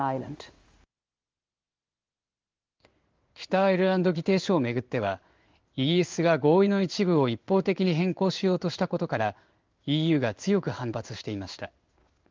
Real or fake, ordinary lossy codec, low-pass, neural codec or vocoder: fake; Opus, 32 kbps; 7.2 kHz; codec, 16 kHz in and 24 kHz out, 1 kbps, XY-Tokenizer